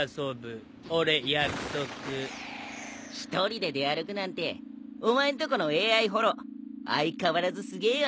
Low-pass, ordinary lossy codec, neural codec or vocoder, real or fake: none; none; none; real